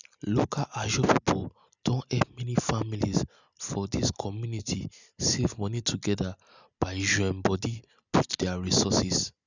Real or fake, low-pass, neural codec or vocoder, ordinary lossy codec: real; 7.2 kHz; none; none